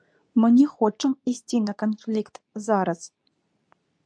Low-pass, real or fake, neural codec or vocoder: 9.9 kHz; fake; codec, 24 kHz, 0.9 kbps, WavTokenizer, medium speech release version 2